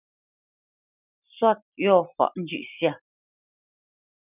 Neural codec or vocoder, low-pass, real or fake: none; 3.6 kHz; real